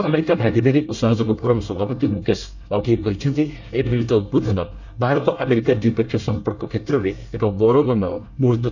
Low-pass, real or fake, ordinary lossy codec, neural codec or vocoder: 7.2 kHz; fake; none; codec, 24 kHz, 1 kbps, SNAC